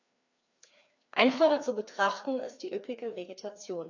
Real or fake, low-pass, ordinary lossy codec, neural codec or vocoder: fake; 7.2 kHz; AAC, 48 kbps; codec, 16 kHz, 2 kbps, FreqCodec, larger model